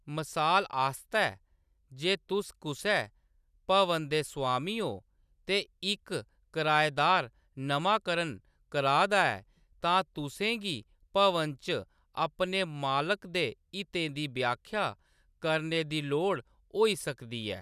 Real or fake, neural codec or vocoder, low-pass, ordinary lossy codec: real; none; 14.4 kHz; none